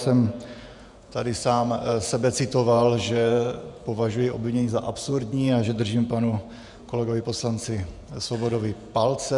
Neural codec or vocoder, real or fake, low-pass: vocoder, 48 kHz, 128 mel bands, Vocos; fake; 10.8 kHz